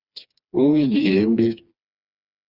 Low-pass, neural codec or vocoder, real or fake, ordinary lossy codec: 5.4 kHz; codec, 16 kHz, 2 kbps, FreqCodec, smaller model; fake; Opus, 64 kbps